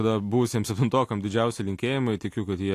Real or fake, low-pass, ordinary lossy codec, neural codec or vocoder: real; 14.4 kHz; AAC, 64 kbps; none